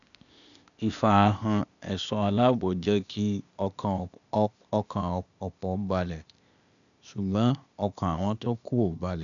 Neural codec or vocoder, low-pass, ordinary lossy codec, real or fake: codec, 16 kHz, 0.8 kbps, ZipCodec; 7.2 kHz; none; fake